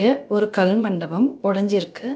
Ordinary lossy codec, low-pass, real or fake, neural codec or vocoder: none; none; fake; codec, 16 kHz, about 1 kbps, DyCAST, with the encoder's durations